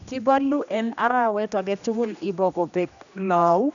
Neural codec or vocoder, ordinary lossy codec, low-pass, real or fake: codec, 16 kHz, 1 kbps, X-Codec, HuBERT features, trained on general audio; none; 7.2 kHz; fake